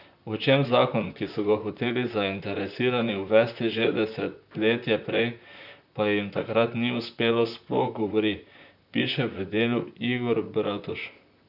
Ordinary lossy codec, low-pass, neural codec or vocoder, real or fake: none; 5.4 kHz; vocoder, 44.1 kHz, 128 mel bands, Pupu-Vocoder; fake